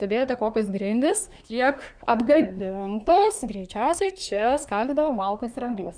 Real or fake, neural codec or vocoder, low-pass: fake; codec, 24 kHz, 1 kbps, SNAC; 9.9 kHz